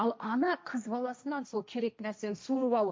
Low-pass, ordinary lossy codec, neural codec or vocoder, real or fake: none; none; codec, 16 kHz, 1.1 kbps, Voila-Tokenizer; fake